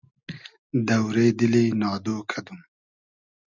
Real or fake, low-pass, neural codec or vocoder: real; 7.2 kHz; none